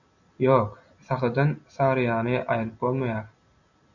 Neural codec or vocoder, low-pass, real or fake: none; 7.2 kHz; real